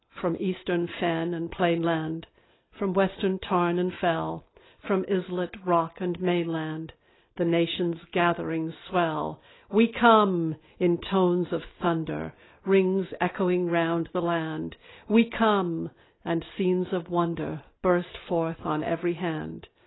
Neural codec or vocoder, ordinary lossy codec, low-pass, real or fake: none; AAC, 16 kbps; 7.2 kHz; real